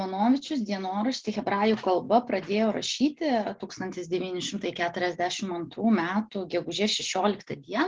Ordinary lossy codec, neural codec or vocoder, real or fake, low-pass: Opus, 16 kbps; none; real; 7.2 kHz